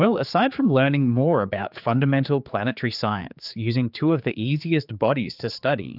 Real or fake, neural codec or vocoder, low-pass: fake; codec, 16 kHz, 4 kbps, X-Codec, HuBERT features, trained on general audio; 5.4 kHz